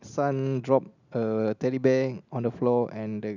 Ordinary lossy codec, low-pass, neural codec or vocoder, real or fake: none; 7.2 kHz; none; real